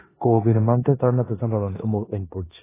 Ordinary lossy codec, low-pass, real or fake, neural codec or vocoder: AAC, 16 kbps; 3.6 kHz; fake; codec, 16 kHz in and 24 kHz out, 0.9 kbps, LongCat-Audio-Codec, four codebook decoder